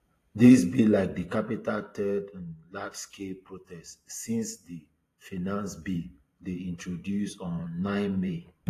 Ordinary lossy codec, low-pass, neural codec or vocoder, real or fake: AAC, 48 kbps; 14.4 kHz; vocoder, 44.1 kHz, 128 mel bands every 512 samples, BigVGAN v2; fake